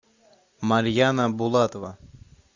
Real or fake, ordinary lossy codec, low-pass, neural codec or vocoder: real; Opus, 64 kbps; 7.2 kHz; none